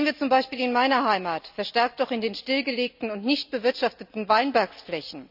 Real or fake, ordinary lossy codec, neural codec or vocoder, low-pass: real; none; none; 5.4 kHz